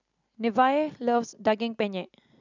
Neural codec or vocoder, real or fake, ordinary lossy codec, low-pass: none; real; none; 7.2 kHz